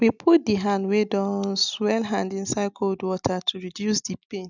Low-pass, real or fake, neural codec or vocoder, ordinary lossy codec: 7.2 kHz; real; none; none